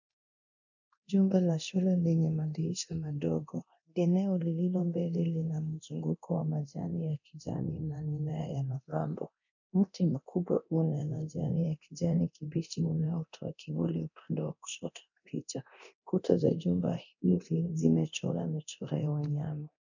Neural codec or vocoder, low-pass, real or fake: codec, 24 kHz, 0.9 kbps, DualCodec; 7.2 kHz; fake